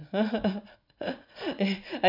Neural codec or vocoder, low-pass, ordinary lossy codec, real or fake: vocoder, 44.1 kHz, 80 mel bands, Vocos; 5.4 kHz; none; fake